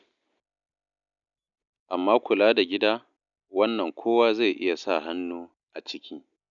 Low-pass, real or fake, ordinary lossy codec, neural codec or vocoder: 7.2 kHz; real; none; none